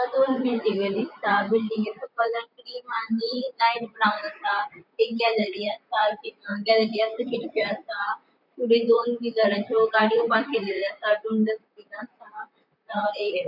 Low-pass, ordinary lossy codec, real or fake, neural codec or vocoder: 5.4 kHz; none; fake; vocoder, 44.1 kHz, 128 mel bands, Pupu-Vocoder